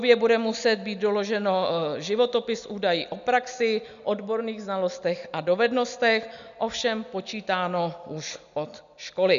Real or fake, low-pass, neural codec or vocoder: real; 7.2 kHz; none